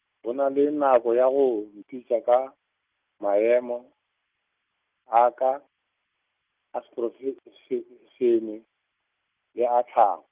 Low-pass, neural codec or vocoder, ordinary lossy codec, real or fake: 3.6 kHz; none; Opus, 24 kbps; real